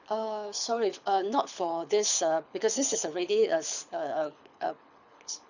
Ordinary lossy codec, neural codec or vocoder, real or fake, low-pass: none; codec, 24 kHz, 6 kbps, HILCodec; fake; 7.2 kHz